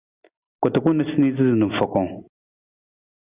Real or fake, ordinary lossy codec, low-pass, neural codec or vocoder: real; Opus, 64 kbps; 3.6 kHz; none